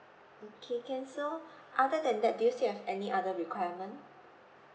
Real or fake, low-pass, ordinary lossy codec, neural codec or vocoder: real; none; none; none